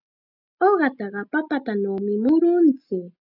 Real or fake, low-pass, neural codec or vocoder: real; 5.4 kHz; none